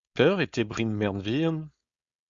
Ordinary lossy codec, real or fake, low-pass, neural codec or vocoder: Opus, 64 kbps; fake; 7.2 kHz; codec, 16 kHz, 4.8 kbps, FACodec